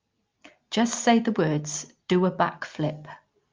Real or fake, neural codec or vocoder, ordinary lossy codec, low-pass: real; none; Opus, 24 kbps; 7.2 kHz